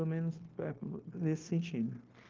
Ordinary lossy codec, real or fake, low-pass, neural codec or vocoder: Opus, 16 kbps; fake; 7.2 kHz; codec, 16 kHz, 0.9 kbps, LongCat-Audio-Codec